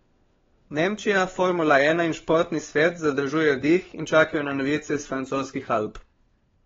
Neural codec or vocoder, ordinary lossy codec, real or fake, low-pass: codec, 16 kHz, 4 kbps, FunCodec, trained on LibriTTS, 50 frames a second; AAC, 24 kbps; fake; 7.2 kHz